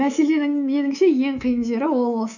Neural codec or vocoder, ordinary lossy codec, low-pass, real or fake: codec, 24 kHz, 3.1 kbps, DualCodec; none; 7.2 kHz; fake